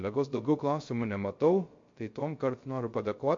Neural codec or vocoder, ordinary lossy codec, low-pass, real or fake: codec, 16 kHz, 0.3 kbps, FocalCodec; MP3, 48 kbps; 7.2 kHz; fake